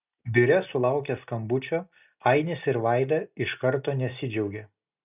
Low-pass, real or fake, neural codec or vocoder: 3.6 kHz; real; none